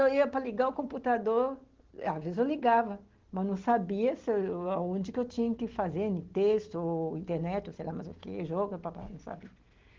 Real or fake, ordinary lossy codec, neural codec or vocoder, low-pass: real; Opus, 16 kbps; none; 7.2 kHz